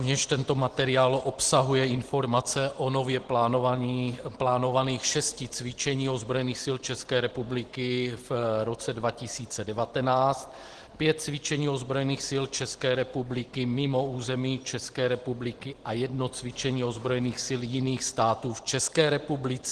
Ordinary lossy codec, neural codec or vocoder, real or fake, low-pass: Opus, 16 kbps; none; real; 10.8 kHz